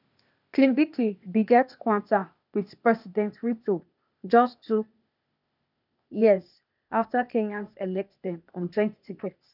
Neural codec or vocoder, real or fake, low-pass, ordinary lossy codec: codec, 16 kHz, 0.8 kbps, ZipCodec; fake; 5.4 kHz; none